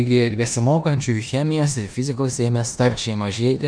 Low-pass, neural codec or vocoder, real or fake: 9.9 kHz; codec, 16 kHz in and 24 kHz out, 0.9 kbps, LongCat-Audio-Codec, four codebook decoder; fake